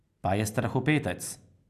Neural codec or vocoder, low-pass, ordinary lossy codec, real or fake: none; 14.4 kHz; none; real